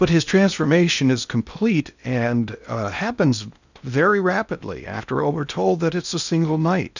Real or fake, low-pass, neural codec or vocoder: fake; 7.2 kHz; codec, 16 kHz in and 24 kHz out, 0.6 kbps, FocalCodec, streaming, 4096 codes